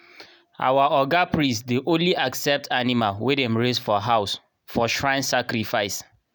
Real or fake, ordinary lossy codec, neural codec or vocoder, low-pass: real; none; none; none